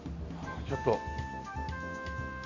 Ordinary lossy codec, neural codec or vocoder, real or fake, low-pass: AAC, 48 kbps; none; real; 7.2 kHz